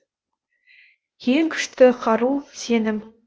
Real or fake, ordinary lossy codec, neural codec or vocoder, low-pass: fake; none; codec, 16 kHz, 0.8 kbps, ZipCodec; none